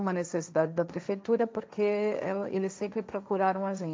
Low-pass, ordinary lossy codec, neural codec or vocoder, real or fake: none; none; codec, 16 kHz, 1.1 kbps, Voila-Tokenizer; fake